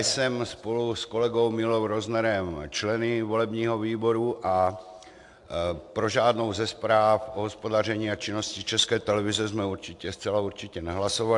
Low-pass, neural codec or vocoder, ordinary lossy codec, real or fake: 10.8 kHz; none; AAC, 64 kbps; real